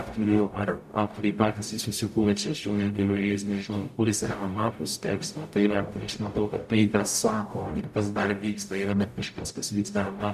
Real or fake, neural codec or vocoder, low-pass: fake; codec, 44.1 kHz, 0.9 kbps, DAC; 14.4 kHz